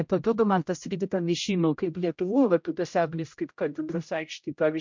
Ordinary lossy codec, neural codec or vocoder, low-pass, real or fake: MP3, 64 kbps; codec, 16 kHz, 0.5 kbps, X-Codec, HuBERT features, trained on general audio; 7.2 kHz; fake